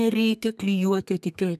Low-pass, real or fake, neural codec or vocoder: 14.4 kHz; fake; codec, 32 kHz, 1.9 kbps, SNAC